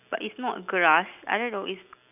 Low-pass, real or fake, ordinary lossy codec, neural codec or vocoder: 3.6 kHz; real; none; none